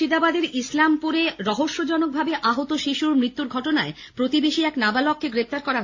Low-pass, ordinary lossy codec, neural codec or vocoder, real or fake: 7.2 kHz; AAC, 48 kbps; none; real